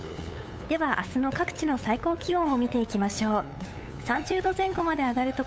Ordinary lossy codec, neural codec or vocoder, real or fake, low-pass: none; codec, 16 kHz, 8 kbps, FunCodec, trained on LibriTTS, 25 frames a second; fake; none